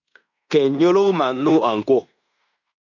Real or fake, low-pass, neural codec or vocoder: fake; 7.2 kHz; codec, 16 kHz in and 24 kHz out, 0.9 kbps, LongCat-Audio-Codec, fine tuned four codebook decoder